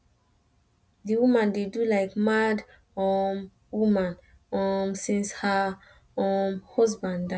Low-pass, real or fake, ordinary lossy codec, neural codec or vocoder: none; real; none; none